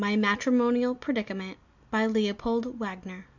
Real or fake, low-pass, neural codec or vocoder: real; 7.2 kHz; none